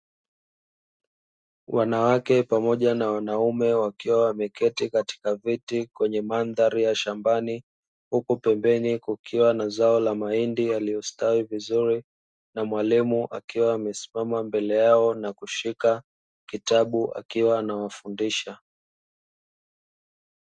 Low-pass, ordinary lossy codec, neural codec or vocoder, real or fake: 9.9 kHz; Opus, 64 kbps; none; real